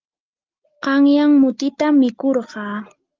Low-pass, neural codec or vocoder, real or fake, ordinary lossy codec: 7.2 kHz; none; real; Opus, 32 kbps